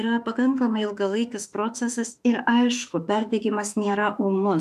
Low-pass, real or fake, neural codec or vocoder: 14.4 kHz; fake; autoencoder, 48 kHz, 32 numbers a frame, DAC-VAE, trained on Japanese speech